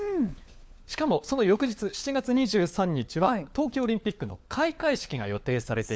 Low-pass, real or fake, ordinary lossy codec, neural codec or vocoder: none; fake; none; codec, 16 kHz, 4 kbps, FunCodec, trained on LibriTTS, 50 frames a second